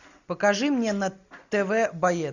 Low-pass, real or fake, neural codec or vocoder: 7.2 kHz; real; none